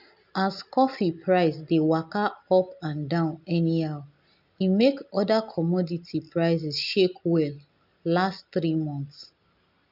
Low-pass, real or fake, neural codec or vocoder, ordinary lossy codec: 5.4 kHz; real; none; none